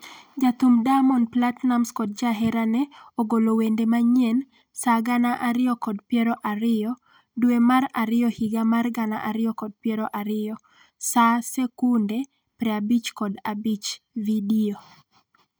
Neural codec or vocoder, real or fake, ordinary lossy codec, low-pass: none; real; none; none